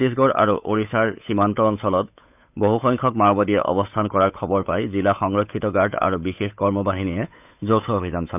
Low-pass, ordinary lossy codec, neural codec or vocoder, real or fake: 3.6 kHz; none; codec, 16 kHz, 8 kbps, FunCodec, trained on Chinese and English, 25 frames a second; fake